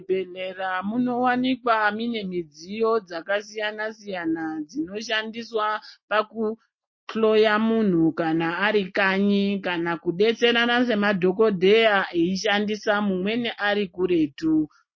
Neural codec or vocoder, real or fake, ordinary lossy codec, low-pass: none; real; MP3, 32 kbps; 7.2 kHz